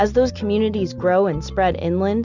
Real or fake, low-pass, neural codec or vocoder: real; 7.2 kHz; none